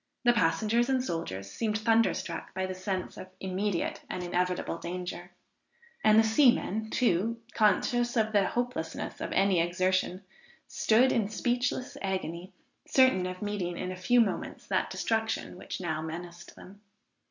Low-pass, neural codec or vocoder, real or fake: 7.2 kHz; none; real